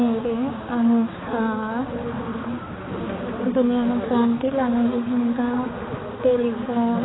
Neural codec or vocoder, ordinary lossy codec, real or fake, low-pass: codec, 16 kHz, 4 kbps, X-Codec, HuBERT features, trained on balanced general audio; AAC, 16 kbps; fake; 7.2 kHz